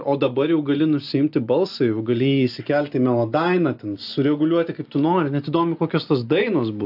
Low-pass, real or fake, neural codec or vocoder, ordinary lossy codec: 5.4 kHz; real; none; AAC, 48 kbps